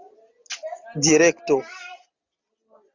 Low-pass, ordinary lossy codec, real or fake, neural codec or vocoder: 7.2 kHz; Opus, 64 kbps; real; none